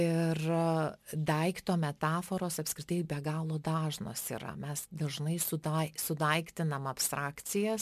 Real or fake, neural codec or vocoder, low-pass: real; none; 14.4 kHz